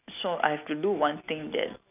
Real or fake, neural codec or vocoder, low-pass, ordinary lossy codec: real; none; 3.6 kHz; none